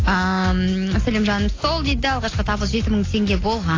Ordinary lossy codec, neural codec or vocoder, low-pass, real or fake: AAC, 32 kbps; none; 7.2 kHz; real